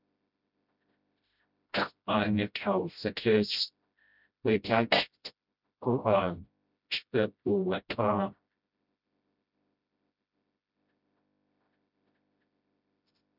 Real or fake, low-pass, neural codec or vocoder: fake; 5.4 kHz; codec, 16 kHz, 0.5 kbps, FreqCodec, smaller model